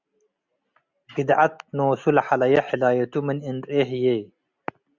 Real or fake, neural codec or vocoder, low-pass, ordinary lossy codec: real; none; 7.2 kHz; Opus, 64 kbps